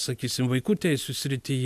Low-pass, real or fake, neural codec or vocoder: 14.4 kHz; real; none